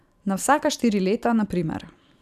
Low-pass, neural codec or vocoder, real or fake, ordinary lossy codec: 14.4 kHz; vocoder, 44.1 kHz, 128 mel bands every 512 samples, BigVGAN v2; fake; none